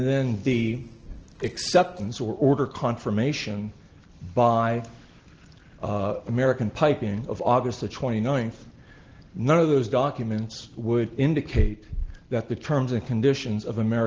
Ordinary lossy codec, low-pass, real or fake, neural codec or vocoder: Opus, 16 kbps; 7.2 kHz; real; none